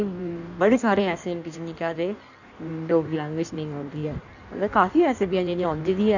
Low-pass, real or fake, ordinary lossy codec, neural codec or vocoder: 7.2 kHz; fake; none; codec, 16 kHz in and 24 kHz out, 1.1 kbps, FireRedTTS-2 codec